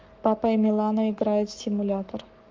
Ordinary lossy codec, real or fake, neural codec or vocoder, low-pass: Opus, 32 kbps; fake; codec, 44.1 kHz, 7.8 kbps, Pupu-Codec; 7.2 kHz